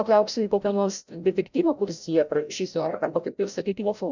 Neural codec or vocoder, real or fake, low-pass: codec, 16 kHz, 0.5 kbps, FreqCodec, larger model; fake; 7.2 kHz